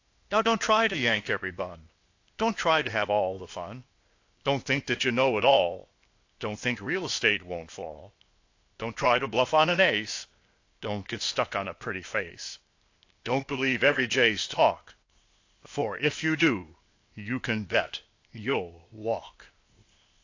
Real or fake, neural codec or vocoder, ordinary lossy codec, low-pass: fake; codec, 16 kHz, 0.8 kbps, ZipCodec; AAC, 48 kbps; 7.2 kHz